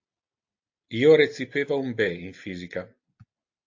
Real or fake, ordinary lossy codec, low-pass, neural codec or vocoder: real; AAC, 48 kbps; 7.2 kHz; none